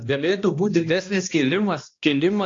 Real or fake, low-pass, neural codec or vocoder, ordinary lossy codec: fake; 7.2 kHz; codec, 16 kHz, 1 kbps, X-Codec, HuBERT features, trained on balanced general audio; AAC, 48 kbps